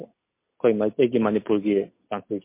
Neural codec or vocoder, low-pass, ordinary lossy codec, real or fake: none; 3.6 kHz; MP3, 24 kbps; real